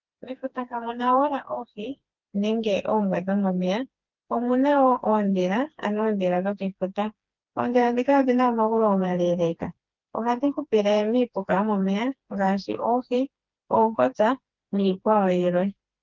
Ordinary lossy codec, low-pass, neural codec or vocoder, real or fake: Opus, 32 kbps; 7.2 kHz; codec, 16 kHz, 2 kbps, FreqCodec, smaller model; fake